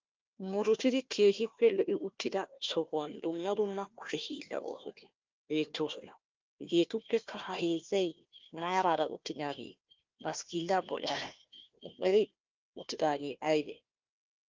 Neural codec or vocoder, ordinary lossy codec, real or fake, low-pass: codec, 16 kHz, 1 kbps, FunCodec, trained on Chinese and English, 50 frames a second; Opus, 24 kbps; fake; 7.2 kHz